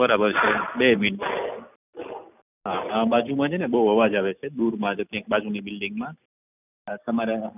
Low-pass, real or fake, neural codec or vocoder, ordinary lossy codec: 3.6 kHz; real; none; none